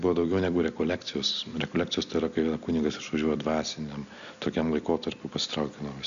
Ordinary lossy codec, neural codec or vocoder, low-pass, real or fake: MP3, 96 kbps; none; 7.2 kHz; real